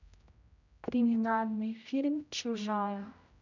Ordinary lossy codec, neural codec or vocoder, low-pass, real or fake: none; codec, 16 kHz, 0.5 kbps, X-Codec, HuBERT features, trained on general audio; 7.2 kHz; fake